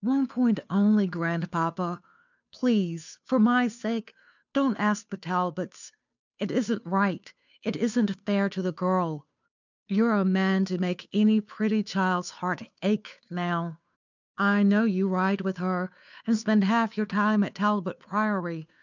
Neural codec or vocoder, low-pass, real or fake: codec, 16 kHz, 2 kbps, FunCodec, trained on Chinese and English, 25 frames a second; 7.2 kHz; fake